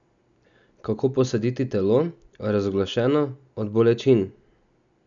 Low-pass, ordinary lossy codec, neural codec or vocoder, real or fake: 7.2 kHz; none; none; real